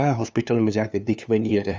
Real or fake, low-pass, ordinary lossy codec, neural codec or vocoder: fake; none; none; codec, 16 kHz, 2 kbps, FunCodec, trained on LibriTTS, 25 frames a second